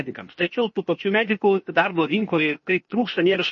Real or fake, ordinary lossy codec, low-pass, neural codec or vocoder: fake; MP3, 32 kbps; 7.2 kHz; codec, 16 kHz, 0.8 kbps, ZipCodec